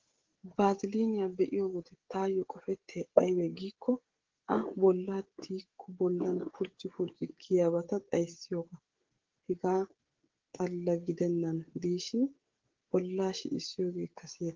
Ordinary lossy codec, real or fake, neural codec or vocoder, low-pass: Opus, 16 kbps; fake; codec, 16 kHz, 16 kbps, FreqCodec, smaller model; 7.2 kHz